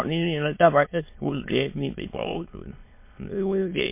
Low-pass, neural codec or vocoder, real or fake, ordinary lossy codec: 3.6 kHz; autoencoder, 22.05 kHz, a latent of 192 numbers a frame, VITS, trained on many speakers; fake; MP3, 24 kbps